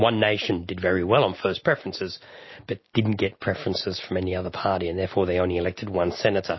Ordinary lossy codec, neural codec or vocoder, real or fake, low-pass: MP3, 24 kbps; none; real; 7.2 kHz